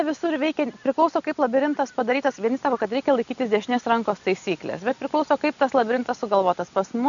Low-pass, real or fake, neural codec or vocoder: 7.2 kHz; real; none